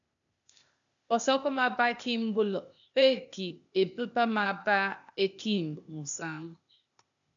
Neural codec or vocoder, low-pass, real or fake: codec, 16 kHz, 0.8 kbps, ZipCodec; 7.2 kHz; fake